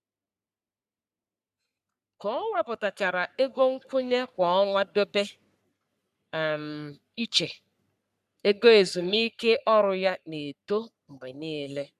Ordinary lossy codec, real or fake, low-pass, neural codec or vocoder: none; fake; 14.4 kHz; codec, 44.1 kHz, 3.4 kbps, Pupu-Codec